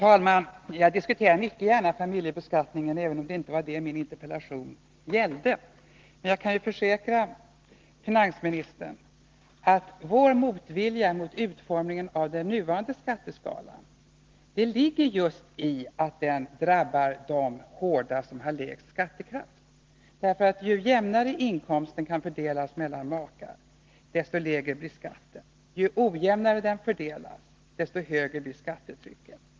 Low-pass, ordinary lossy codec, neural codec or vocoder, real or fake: 7.2 kHz; Opus, 16 kbps; none; real